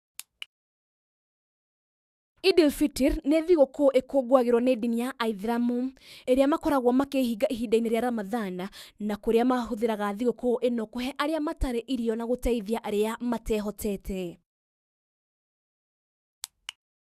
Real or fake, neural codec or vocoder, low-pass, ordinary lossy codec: fake; autoencoder, 48 kHz, 128 numbers a frame, DAC-VAE, trained on Japanese speech; 14.4 kHz; Opus, 64 kbps